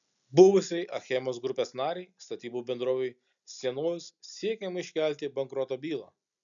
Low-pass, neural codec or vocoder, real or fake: 7.2 kHz; none; real